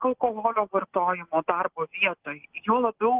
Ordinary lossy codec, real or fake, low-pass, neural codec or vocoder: Opus, 16 kbps; real; 3.6 kHz; none